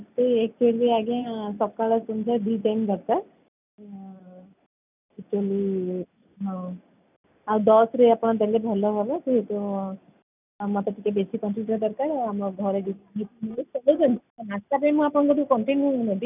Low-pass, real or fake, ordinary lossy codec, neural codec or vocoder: 3.6 kHz; real; none; none